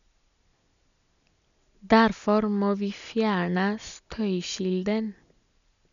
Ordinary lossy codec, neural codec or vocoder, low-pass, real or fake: none; none; 7.2 kHz; real